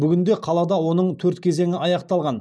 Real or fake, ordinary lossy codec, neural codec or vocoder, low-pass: real; none; none; none